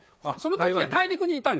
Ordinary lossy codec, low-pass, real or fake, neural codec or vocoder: none; none; fake; codec, 16 kHz, 4 kbps, FreqCodec, larger model